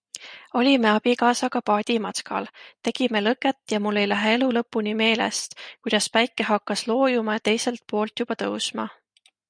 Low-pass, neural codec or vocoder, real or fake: 9.9 kHz; none; real